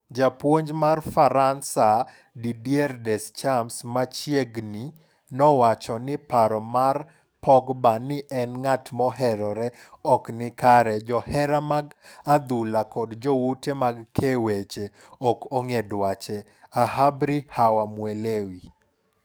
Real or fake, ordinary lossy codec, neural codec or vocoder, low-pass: fake; none; codec, 44.1 kHz, 7.8 kbps, DAC; none